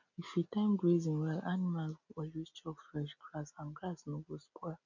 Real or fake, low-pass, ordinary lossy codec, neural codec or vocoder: real; 7.2 kHz; none; none